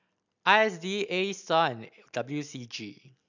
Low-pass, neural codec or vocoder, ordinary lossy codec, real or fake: 7.2 kHz; none; none; real